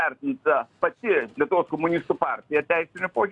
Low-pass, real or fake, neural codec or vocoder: 10.8 kHz; real; none